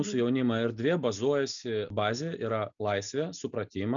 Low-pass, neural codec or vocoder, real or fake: 7.2 kHz; none; real